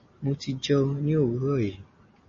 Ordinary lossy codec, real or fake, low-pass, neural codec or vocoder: MP3, 32 kbps; real; 7.2 kHz; none